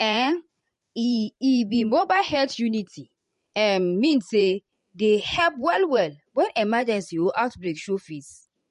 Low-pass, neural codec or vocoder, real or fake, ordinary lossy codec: 14.4 kHz; vocoder, 44.1 kHz, 128 mel bands every 512 samples, BigVGAN v2; fake; MP3, 48 kbps